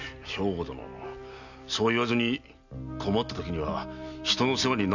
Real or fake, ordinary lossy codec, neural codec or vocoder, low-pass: real; none; none; 7.2 kHz